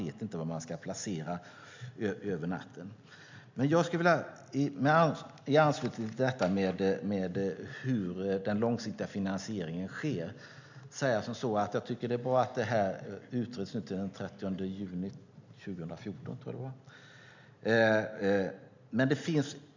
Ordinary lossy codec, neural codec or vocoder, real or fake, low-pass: MP3, 64 kbps; none; real; 7.2 kHz